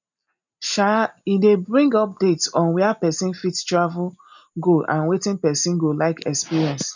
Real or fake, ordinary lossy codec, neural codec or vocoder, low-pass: real; none; none; 7.2 kHz